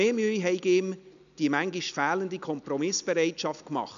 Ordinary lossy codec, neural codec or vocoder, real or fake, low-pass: none; none; real; 7.2 kHz